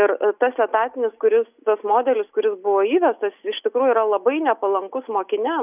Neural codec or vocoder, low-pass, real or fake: none; 3.6 kHz; real